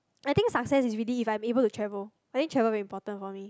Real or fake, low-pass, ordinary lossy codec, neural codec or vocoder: real; none; none; none